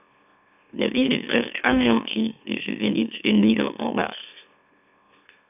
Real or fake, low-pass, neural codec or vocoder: fake; 3.6 kHz; autoencoder, 44.1 kHz, a latent of 192 numbers a frame, MeloTTS